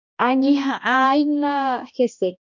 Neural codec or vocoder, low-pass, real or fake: codec, 16 kHz, 1 kbps, X-Codec, HuBERT features, trained on balanced general audio; 7.2 kHz; fake